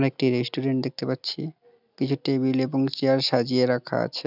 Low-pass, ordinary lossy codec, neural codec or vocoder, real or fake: 5.4 kHz; none; none; real